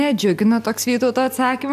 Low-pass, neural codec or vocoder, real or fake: 14.4 kHz; none; real